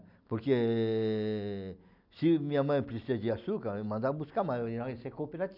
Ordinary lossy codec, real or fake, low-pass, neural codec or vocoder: none; real; 5.4 kHz; none